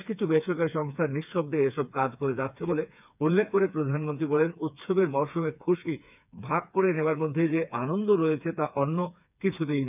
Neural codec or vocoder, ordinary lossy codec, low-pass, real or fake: codec, 16 kHz, 4 kbps, FreqCodec, smaller model; none; 3.6 kHz; fake